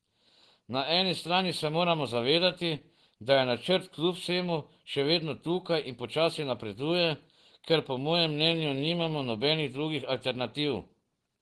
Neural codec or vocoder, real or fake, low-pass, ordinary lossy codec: none; real; 10.8 kHz; Opus, 16 kbps